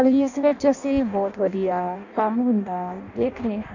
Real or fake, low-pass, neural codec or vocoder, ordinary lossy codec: fake; 7.2 kHz; codec, 16 kHz in and 24 kHz out, 0.6 kbps, FireRedTTS-2 codec; none